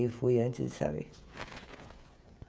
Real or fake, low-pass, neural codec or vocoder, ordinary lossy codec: fake; none; codec, 16 kHz, 16 kbps, FreqCodec, smaller model; none